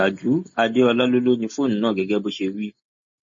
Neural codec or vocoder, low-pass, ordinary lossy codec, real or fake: none; 7.2 kHz; MP3, 32 kbps; real